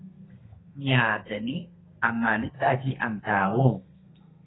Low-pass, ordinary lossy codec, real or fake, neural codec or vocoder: 7.2 kHz; AAC, 16 kbps; fake; codec, 32 kHz, 1.9 kbps, SNAC